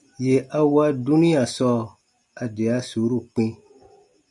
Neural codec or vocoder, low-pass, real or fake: none; 10.8 kHz; real